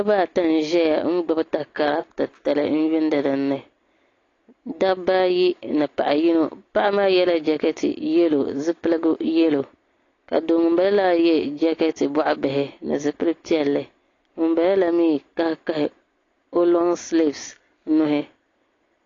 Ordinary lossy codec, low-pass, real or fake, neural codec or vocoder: AAC, 32 kbps; 7.2 kHz; real; none